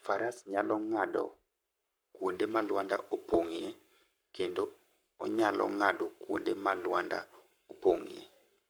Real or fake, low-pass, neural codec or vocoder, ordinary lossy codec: fake; none; codec, 44.1 kHz, 7.8 kbps, Pupu-Codec; none